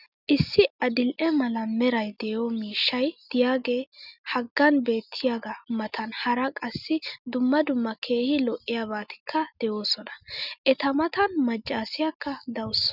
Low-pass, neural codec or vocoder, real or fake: 5.4 kHz; none; real